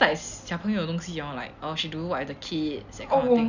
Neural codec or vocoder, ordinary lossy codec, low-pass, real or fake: none; none; 7.2 kHz; real